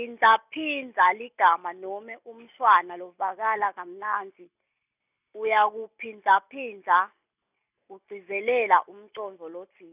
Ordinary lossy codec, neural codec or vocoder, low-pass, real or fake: none; none; 3.6 kHz; real